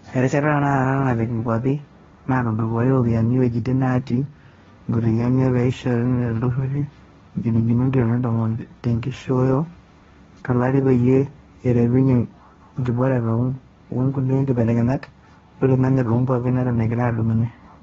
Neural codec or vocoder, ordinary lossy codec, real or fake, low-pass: codec, 16 kHz, 1.1 kbps, Voila-Tokenizer; AAC, 24 kbps; fake; 7.2 kHz